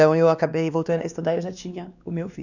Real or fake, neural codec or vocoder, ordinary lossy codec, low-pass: fake; codec, 16 kHz, 2 kbps, X-Codec, WavLM features, trained on Multilingual LibriSpeech; none; 7.2 kHz